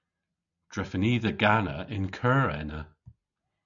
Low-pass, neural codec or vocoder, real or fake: 7.2 kHz; none; real